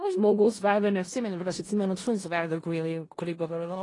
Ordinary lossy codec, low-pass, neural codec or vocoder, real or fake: AAC, 32 kbps; 10.8 kHz; codec, 16 kHz in and 24 kHz out, 0.4 kbps, LongCat-Audio-Codec, four codebook decoder; fake